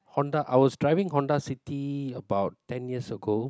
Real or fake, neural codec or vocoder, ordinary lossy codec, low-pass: real; none; none; none